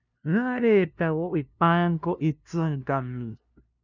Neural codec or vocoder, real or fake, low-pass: codec, 16 kHz, 0.5 kbps, FunCodec, trained on LibriTTS, 25 frames a second; fake; 7.2 kHz